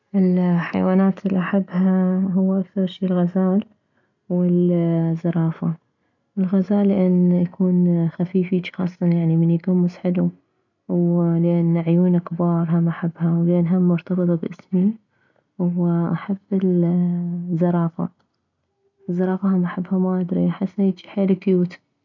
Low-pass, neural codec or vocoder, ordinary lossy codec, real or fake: 7.2 kHz; none; none; real